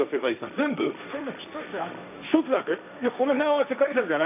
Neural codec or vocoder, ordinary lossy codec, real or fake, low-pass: codec, 16 kHz, 1.1 kbps, Voila-Tokenizer; none; fake; 3.6 kHz